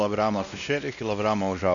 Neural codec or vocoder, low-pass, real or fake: codec, 16 kHz, 1 kbps, X-Codec, WavLM features, trained on Multilingual LibriSpeech; 7.2 kHz; fake